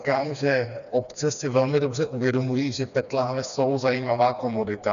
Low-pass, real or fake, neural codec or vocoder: 7.2 kHz; fake; codec, 16 kHz, 2 kbps, FreqCodec, smaller model